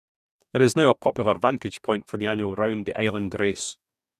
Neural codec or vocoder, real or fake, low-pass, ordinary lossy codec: codec, 44.1 kHz, 2.6 kbps, DAC; fake; 14.4 kHz; none